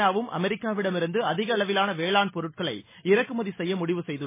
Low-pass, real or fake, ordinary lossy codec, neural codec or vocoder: 3.6 kHz; real; MP3, 16 kbps; none